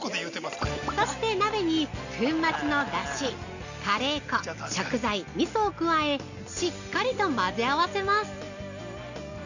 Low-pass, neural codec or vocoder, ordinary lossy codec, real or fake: 7.2 kHz; none; none; real